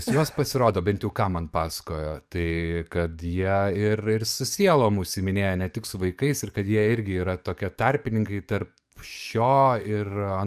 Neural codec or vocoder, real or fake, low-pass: codec, 44.1 kHz, 7.8 kbps, DAC; fake; 14.4 kHz